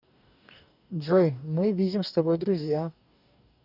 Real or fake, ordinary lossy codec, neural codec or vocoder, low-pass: fake; none; codec, 44.1 kHz, 2.6 kbps, DAC; 5.4 kHz